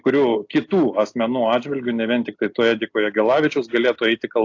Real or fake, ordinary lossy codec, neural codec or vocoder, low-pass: real; AAC, 48 kbps; none; 7.2 kHz